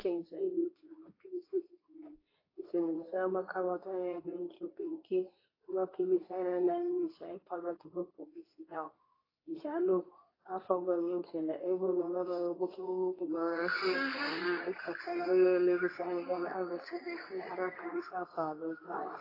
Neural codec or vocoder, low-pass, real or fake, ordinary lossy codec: codec, 24 kHz, 0.9 kbps, WavTokenizer, medium speech release version 2; 5.4 kHz; fake; AAC, 24 kbps